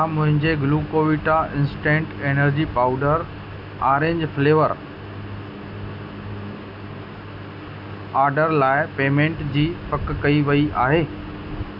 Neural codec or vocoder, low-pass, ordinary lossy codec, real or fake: none; 5.4 kHz; none; real